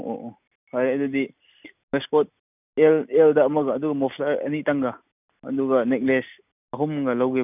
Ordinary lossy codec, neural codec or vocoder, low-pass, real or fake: none; none; 3.6 kHz; real